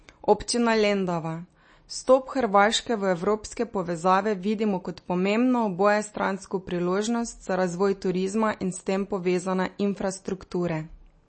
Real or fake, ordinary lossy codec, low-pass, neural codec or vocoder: real; MP3, 32 kbps; 9.9 kHz; none